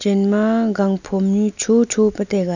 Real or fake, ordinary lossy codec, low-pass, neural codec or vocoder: real; none; 7.2 kHz; none